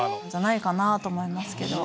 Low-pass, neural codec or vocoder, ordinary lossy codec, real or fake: none; none; none; real